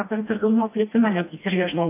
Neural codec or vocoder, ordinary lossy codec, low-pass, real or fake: codec, 16 kHz, 1 kbps, FreqCodec, smaller model; AAC, 24 kbps; 3.6 kHz; fake